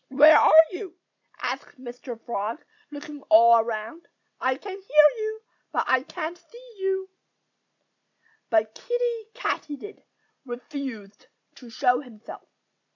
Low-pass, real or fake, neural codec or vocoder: 7.2 kHz; real; none